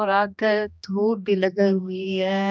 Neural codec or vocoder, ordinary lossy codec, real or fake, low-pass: codec, 16 kHz, 1 kbps, X-Codec, HuBERT features, trained on general audio; none; fake; none